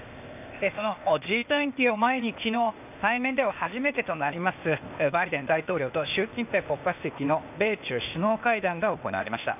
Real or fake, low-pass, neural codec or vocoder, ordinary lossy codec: fake; 3.6 kHz; codec, 16 kHz, 0.8 kbps, ZipCodec; none